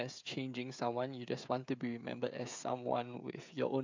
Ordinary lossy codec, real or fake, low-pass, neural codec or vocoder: MP3, 64 kbps; fake; 7.2 kHz; codec, 16 kHz, 16 kbps, FreqCodec, smaller model